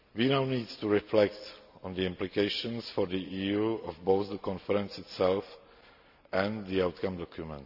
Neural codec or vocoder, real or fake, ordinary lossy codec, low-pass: none; real; none; 5.4 kHz